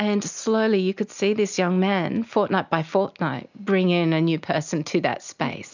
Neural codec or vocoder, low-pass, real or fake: none; 7.2 kHz; real